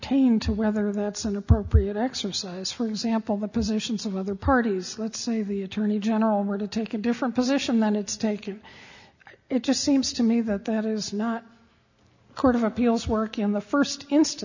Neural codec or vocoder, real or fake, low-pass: none; real; 7.2 kHz